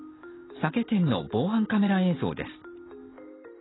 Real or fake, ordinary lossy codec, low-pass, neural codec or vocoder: real; AAC, 16 kbps; 7.2 kHz; none